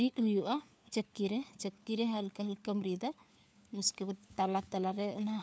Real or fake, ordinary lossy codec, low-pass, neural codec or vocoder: fake; none; none; codec, 16 kHz, 4 kbps, FunCodec, trained on LibriTTS, 50 frames a second